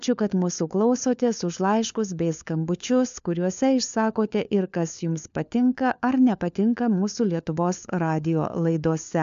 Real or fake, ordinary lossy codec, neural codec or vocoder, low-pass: fake; MP3, 64 kbps; codec, 16 kHz, 4 kbps, FunCodec, trained on LibriTTS, 50 frames a second; 7.2 kHz